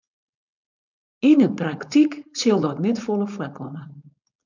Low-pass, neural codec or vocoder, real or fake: 7.2 kHz; codec, 16 kHz, 4.8 kbps, FACodec; fake